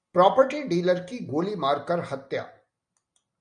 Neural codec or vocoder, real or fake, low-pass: none; real; 9.9 kHz